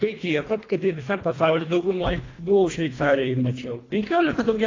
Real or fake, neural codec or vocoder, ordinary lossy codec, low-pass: fake; codec, 24 kHz, 1.5 kbps, HILCodec; AAC, 32 kbps; 7.2 kHz